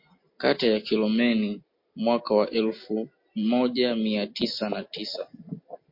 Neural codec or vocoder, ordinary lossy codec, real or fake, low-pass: none; MP3, 32 kbps; real; 5.4 kHz